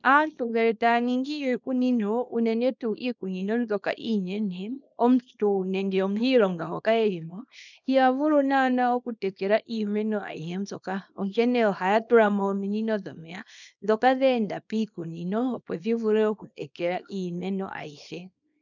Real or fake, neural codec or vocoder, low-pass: fake; codec, 24 kHz, 0.9 kbps, WavTokenizer, small release; 7.2 kHz